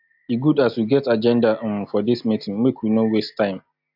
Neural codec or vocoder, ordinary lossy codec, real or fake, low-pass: none; AAC, 48 kbps; real; 5.4 kHz